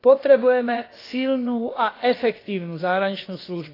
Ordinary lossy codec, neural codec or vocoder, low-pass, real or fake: AAC, 24 kbps; codec, 16 kHz, about 1 kbps, DyCAST, with the encoder's durations; 5.4 kHz; fake